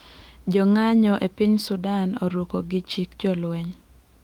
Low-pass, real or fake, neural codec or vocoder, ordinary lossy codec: 19.8 kHz; fake; autoencoder, 48 kHz, 128 numbers a frame, DAC-VAE, trained on Japanese speech; Opus, 24 kbps